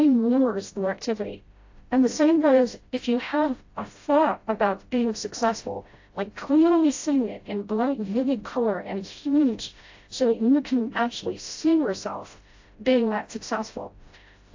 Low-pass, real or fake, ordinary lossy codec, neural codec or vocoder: 7.2 kHz; fake; AAC, 48 kbps; codec, 16 kHz, 0.5 kbps, FreqCodec, smaller model